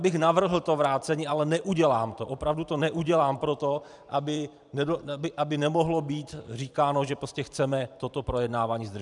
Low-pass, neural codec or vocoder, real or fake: 10.8 kHz; vocoder, 44.1 kHz, 128 mel bands every 512 samples, BigVGAN v2; fake